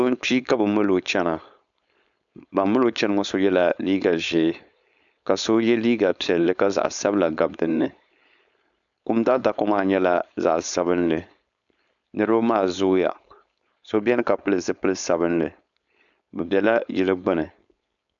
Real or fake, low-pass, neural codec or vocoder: fake; 7.2 kHz; codec, 16 kHz, 4.8 kbps, FACodec